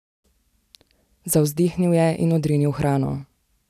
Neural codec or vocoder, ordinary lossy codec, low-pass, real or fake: none; none; 14.4 kHz; real